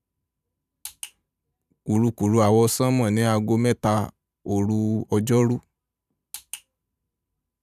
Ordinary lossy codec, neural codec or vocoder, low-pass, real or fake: none; vocoder, 44.1 kHz, 128 mel bands every 512 samples, BigVGAN v2; 14.4 kHz; fake